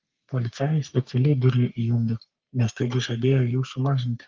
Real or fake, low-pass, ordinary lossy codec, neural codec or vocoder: fake; 7.2 kHz; Opus, 32 kbps; codec, 44.1 kHz, 3.4 kbps, Pupu-Codec